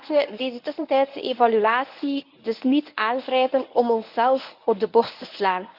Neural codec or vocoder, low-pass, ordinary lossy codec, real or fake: codec, 24 kHz, 0.9 kbps, WavTokenizer, medium speech release version 1; 5.4 kHz; AAC, 48 kbps; fake